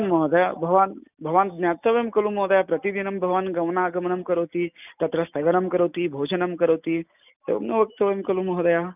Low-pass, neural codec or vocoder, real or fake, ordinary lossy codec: 3.6 kHz; none; real; none